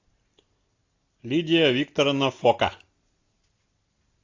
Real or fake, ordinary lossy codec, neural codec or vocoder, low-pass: real; AAC, 48 kbps; none; 7.2 kHz